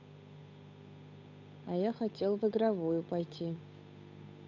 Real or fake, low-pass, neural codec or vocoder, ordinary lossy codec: fake; 7.2 kHz; codec, 16 kHz, 8 kbps, FunCodec, trained on Chinese and English, 25 frames a second; AAC, 48 kbps